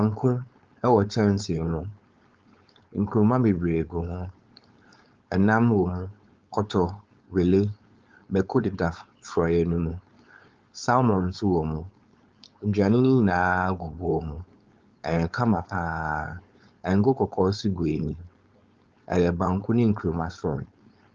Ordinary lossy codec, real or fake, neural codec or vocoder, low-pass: Opus, 24 kbps; fake; codec, 16 kHz, 4.8 kbps, FACodec; 7.2 kHz